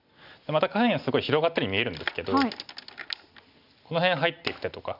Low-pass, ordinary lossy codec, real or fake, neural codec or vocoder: 5.4 kHz; none; real; none